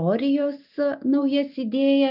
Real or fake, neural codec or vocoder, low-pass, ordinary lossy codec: real; none; 5.4 kHz; MP3, 48 kbps